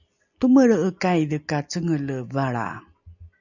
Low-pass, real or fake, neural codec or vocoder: 7.2 kHz; real; none